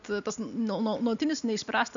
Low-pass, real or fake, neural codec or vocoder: 7.2 kHz; real; none